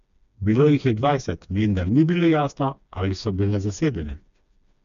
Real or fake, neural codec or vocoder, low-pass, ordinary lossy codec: fake; codec, 16 kHz, 2 kbps, FreqCodec, smaller model; 7.2 kHz; AAC, 96 kbps